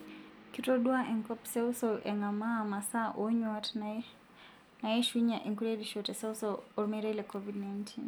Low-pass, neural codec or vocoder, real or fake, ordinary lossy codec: none; none; real; none